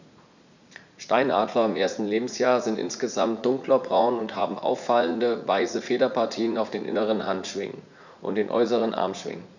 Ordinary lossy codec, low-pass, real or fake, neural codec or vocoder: none; 7.2 kHz; fake; vocoder, 44.1 kHz, 80 mel bands, Vocos